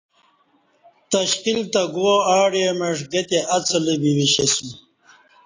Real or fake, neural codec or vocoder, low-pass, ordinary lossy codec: real; none; 7.2 kHz; AAC, 32 kbps